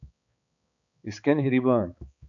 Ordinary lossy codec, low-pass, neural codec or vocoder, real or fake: AAC, 64 kbps; 7.2 kHz; codec, 16 kHz, 4 kbps, X-Codec, HuBERT features, trained on balanced general audio; fake